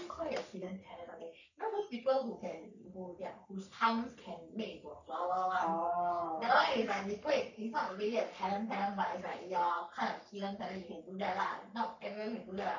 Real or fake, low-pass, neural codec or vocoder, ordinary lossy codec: fake; 7.2 kHz; codec, 44.1 kHz, 3.4 kbps, Pupu-Codec; none